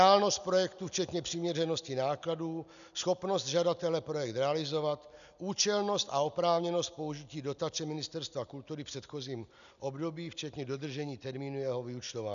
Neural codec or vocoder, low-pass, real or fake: none; 7.2 kHz; real